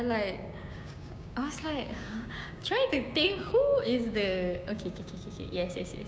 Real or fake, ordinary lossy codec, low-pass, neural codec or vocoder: fake; none; none; codec, 16 kHz, 6 kbps, DAC